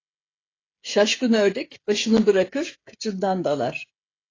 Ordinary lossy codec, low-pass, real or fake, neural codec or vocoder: AAC, 32 kbps; 7.2 kHz; fake; codec, 16 kHz, 16 kbps, FreqCodec, smaller model